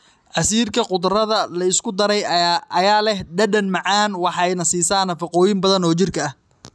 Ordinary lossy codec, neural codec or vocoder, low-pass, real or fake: none; none; none; real